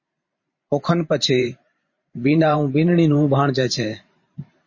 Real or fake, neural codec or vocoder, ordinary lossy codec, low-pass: fake; vocoder, 44.1 kHz, 128 mel bands every 512 samples, BigVGAN v2; MP3, 32 kbps; 7.2 kHz